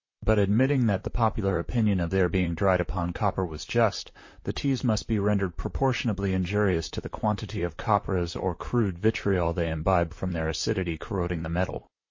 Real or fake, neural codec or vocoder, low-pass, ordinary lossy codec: fake; vocoder, 44.1 kHz, 128 mel bands, Pupu-Vocoder; 7.2 kHz; MP3, 32 kbps